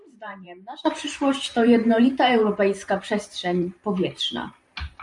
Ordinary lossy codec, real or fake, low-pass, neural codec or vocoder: MP3, 64 kbps; fake; 10.8 kHz; vocoder, 44.1 kHz, 128 mel bands every 512 samples, BigVGAN v2